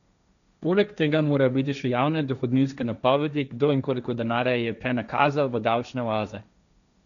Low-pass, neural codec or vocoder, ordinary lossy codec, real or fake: 7.2 kHz; codec, 16 kHz, 1.1 kbps, Voila-Tokenizer; none; fake